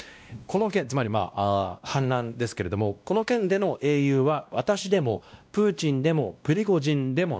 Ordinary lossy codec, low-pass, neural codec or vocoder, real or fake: none; none; codec, 16 kHz, 1 kbps, X-Codec, WavLM features, trained on Multilingual LibriSpeech; fake